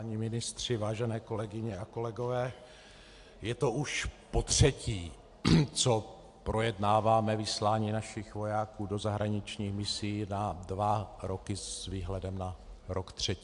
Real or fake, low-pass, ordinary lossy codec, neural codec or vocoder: real; 10.8 kHz; AAC, 64 kbps; none